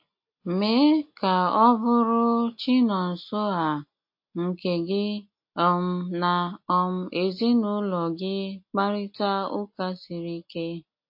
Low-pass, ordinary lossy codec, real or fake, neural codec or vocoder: 5.4 kHz; MP3, 32 kbps; real; none